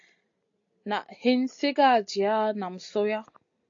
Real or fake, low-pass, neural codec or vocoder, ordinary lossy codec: real; 7.2 kHz; none; AAC, 48 kbps